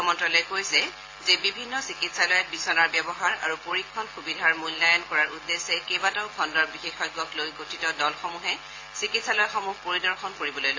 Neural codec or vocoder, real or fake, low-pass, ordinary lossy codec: none; real; 7.2 kHz; AAC, 32 kbps